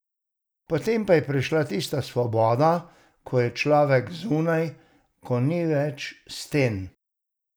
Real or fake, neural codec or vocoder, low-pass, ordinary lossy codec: real; none; none; none